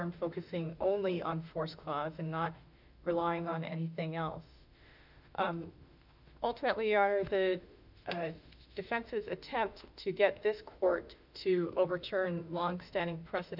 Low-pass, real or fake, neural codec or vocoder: 5.4 kHz; fake; autoencoder, 48 kHz, 32 numbers a frame, DAC-VAE, trained on Japanese speech